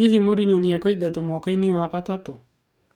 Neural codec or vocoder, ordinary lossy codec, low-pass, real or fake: codec, 44.1 kHz, 2.6 kbps, DAC; none; 19.8 kHz; fake